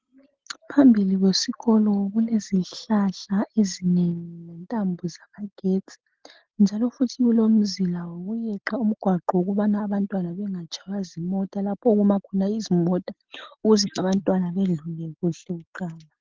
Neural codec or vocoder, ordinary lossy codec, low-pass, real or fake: none; Opus, 16 kbps; 7.2 kHz; real